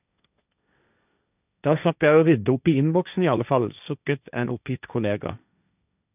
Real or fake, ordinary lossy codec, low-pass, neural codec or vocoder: fake; none; 3.6 kHz; codec, 16 kHz, 1.1 kbps, Voila-Tokenizer